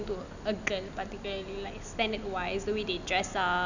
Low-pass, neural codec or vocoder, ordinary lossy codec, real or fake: 7.2 kHz; none; none; real